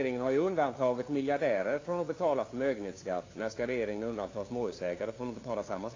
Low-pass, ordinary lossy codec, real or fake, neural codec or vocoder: 7.2 kHz; AAC, 32 kbps; fake; codec, 16 kHz, 2 kbps, FunCodec, trained on LibriTTS, 25 frames a second